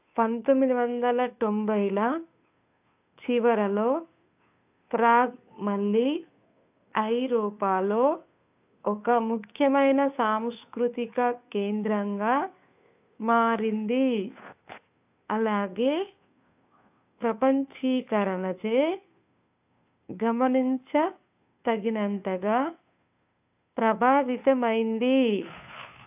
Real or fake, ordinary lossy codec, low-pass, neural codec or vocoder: fake; AAC, 32 kbps; 3.6 kHz; codec, 16 kHz, 2 kbps, FunCodec, trained on Chinese and English, 25 frames a second